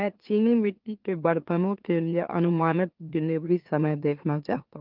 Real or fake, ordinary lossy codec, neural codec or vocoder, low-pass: fake; Opus, 32 kbps; autoencoder, 44.1 kHz, a latent of 192 numbers a frame, MeloTTS; 5.4 kHz